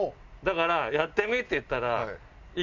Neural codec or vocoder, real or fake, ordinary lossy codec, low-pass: none; real; none; 7.2 kHz